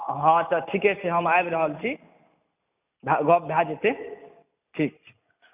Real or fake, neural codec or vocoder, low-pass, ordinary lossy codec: real; none; 3.6 kHz; none